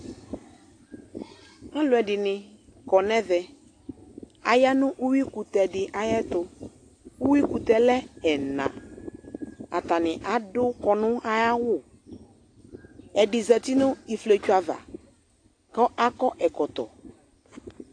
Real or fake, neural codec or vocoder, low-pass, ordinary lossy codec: real; none; 9.9 kHz; AAC, 48 kbps